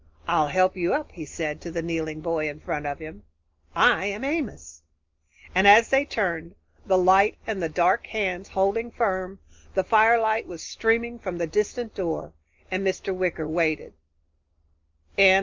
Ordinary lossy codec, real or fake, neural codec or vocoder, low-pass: Opus, 24 kbps; real; none; 7.2 kHz